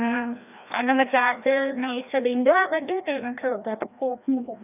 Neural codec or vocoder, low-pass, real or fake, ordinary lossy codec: codec, 16 kHz, 1 kbps, FreqCodec, larger model; 3.6 kHz; fake; none